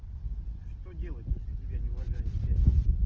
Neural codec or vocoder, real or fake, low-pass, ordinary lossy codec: none; real; 7.2 kHz; Opus, 16 kbps